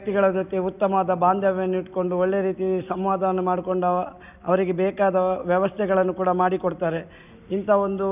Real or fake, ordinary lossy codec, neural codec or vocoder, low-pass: real; none; none; 3.6 kHz